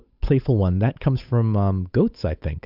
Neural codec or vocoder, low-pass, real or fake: codec, 16 kHz, 8 kbps, FunCodec, trained on Chinese and English, 25 frames a second; 5.4 kHz; fake